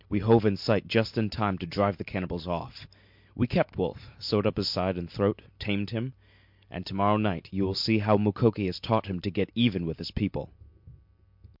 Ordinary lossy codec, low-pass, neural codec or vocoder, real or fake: MP3, 48 kbps; 5.4 kHz; none; real